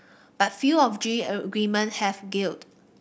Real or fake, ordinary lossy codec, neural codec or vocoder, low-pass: real; none; none; none